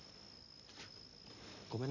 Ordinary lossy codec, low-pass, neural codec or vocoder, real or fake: none; 7.2 kHz; none; real